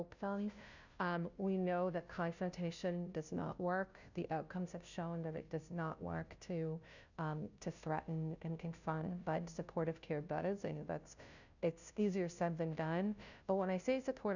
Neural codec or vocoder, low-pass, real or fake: codec, 16 kHz, 0.5 kbps, FunCodec, trained on Chinese and English, 25 frames a second; 7.2 kHz; fake